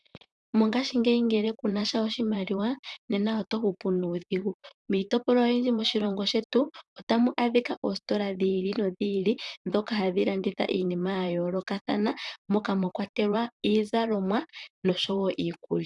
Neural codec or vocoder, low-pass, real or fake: vocoder, 44.1 kHz, 128 mel bands, Pupu-Vocoder; 10.8 kHz; fake